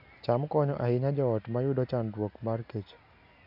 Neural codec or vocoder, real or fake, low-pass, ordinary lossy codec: none; real; 5.4 kHz; none